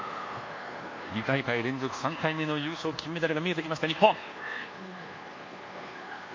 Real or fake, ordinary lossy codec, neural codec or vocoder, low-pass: fake; MP3, 48 kbps; codec, 24 kHz, 1.2 kbps, DualCodec; 7.2 kHz